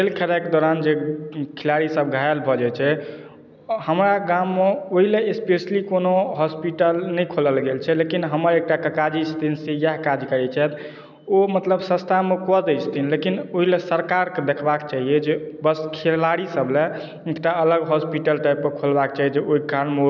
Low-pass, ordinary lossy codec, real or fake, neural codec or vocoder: 7.2 kHz; none; real; none